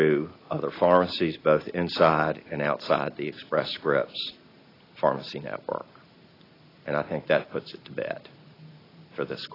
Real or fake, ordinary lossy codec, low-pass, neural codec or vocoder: real; AAC, 24 kbps; 5.4 kHz; none